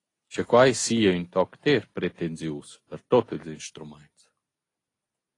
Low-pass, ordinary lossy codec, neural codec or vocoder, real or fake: 10.8 kHz; AAC, 32 kbps; none; real